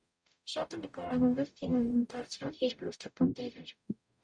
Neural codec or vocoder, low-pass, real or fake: codec, 44.1 kHz, 0.9 kbps, DAC; 9.9 kHz; fake